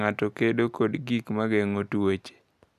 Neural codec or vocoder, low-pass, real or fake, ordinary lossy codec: none; 14.4 kHz; real; none